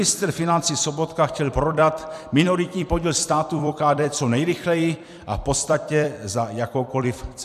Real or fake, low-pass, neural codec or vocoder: fake; 14.4 kHz; vocoder, 44.1 kHz, 128 mel bands every 256 samples, BigVGAN v2